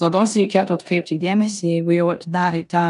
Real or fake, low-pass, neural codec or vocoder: fake; 10.8 kHz; codec, 16 kHz in and 24 kHz out, 0.9 kbps, LongCat-Audio-Codec, four codebook decoder